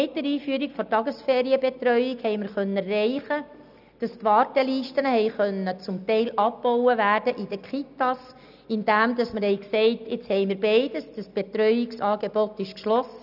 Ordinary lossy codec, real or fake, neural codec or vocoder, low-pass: none; real; none; 5.4 kHz